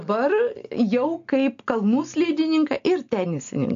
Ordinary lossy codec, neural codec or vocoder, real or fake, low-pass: AAC, 64 kbps; none; real; 7.2 kHz